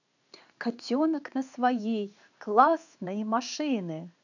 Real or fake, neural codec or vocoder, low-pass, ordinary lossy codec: fake; codec, 16 kHz in and 24 kHz out, 1 kbps, XY-Tokenizer; 7.2 kHz; none